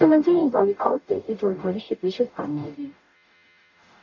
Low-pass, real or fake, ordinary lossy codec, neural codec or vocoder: 7.2 kHz; fake; none; codec, 44.1 kHz, 0.9 kbps, DAC